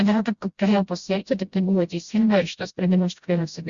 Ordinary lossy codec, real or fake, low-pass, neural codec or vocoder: Opus, 64 kbps; fake; 7.2 kHz; codec, 16 kHz, 0.5 kbps, FreqCodec, smaller model